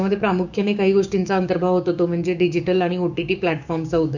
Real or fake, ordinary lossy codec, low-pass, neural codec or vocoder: fake; none; 7.2 kHz; codec, 16 kHz, 6 kbps, DAC